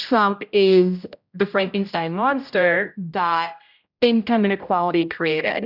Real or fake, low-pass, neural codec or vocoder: fake; 5.4 kHz; codec, 16 kHz, 0.5 kbps, X-Codec, HuBERT features, trained on general audio